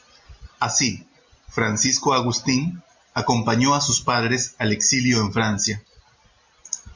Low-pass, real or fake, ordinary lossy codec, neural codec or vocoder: 7.2 kHz; real; MP3, 48 kbps; none